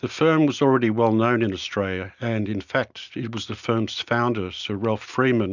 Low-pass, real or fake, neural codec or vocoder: 7.2 kHz; real; none